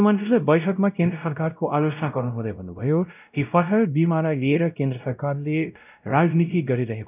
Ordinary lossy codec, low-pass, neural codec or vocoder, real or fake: none; 3.6 kHz; codec, 16 kHz, 0.5 kbps, X-Codec, WavLM features, trained on Multilingual LibriSpeech; fake